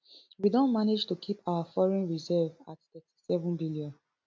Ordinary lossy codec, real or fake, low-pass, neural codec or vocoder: none; real; none; none